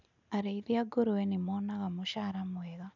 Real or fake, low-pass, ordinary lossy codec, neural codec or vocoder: real; 7.2 kHz; none; none